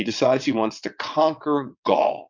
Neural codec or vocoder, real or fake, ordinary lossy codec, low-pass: vocoder, 22.05 kHz, 80 mel bands, WaveNeXt; fake; MP3, 64 kbps; 7.2 kHz